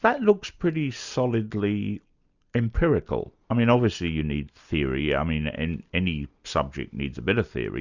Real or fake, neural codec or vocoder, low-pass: real; none; 7.2 kHz